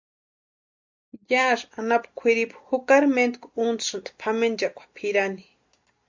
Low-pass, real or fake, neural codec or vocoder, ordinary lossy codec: 7.2 kHz; real; none; MP3, 48 kbps